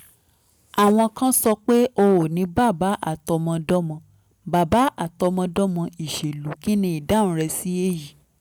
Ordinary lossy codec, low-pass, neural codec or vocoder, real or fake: none; none; none; real